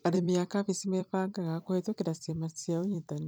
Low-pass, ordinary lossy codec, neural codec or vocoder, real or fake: none; none; vocoder, 44.1 kHz, 128 mel bands every 256 samples, BigVGAN v2; fake